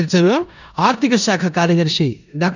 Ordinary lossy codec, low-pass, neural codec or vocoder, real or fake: none; 7.2 kHz; codec, 16 kHz in and 24 kHz out, 0.9 kbps, LongCat-Audio-Codec, four codebook decoder; fake